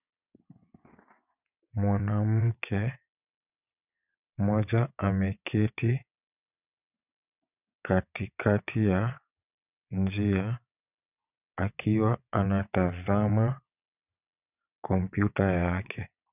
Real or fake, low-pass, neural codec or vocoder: fake; 3.6 kHz; vocoder, 22.05 kHz, 80 mel bands, WaveNeXt